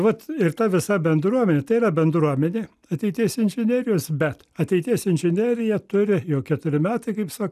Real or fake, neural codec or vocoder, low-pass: real; none; 14.4 kHz